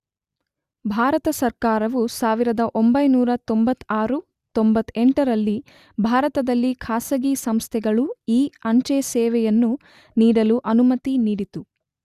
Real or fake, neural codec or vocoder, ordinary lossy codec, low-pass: real; none; Opus, 64 kbps; 14.4 kHz